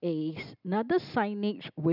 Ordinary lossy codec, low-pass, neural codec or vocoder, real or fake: none; 5.4 kHz; none; real